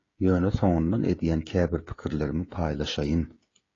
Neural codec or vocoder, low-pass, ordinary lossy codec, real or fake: codec, 16 kHz, 16 kbps, FreqCodec, smaller model; 7.2 kHz; AAC, 32 kbps; fake